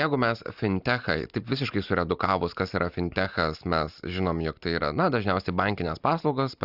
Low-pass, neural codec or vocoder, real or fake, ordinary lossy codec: 5.4 kHz; none; real; Opus, 64 kbps